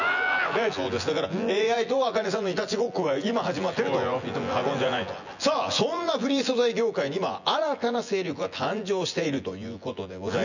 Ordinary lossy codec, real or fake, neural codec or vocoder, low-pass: none; fake; vocoder, 24 kHz, 100 mel bands, Vocos; 7.2 kHz